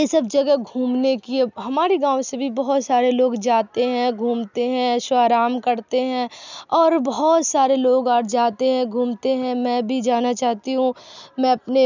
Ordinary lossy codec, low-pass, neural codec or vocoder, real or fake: none; 7.2 kHz; none; real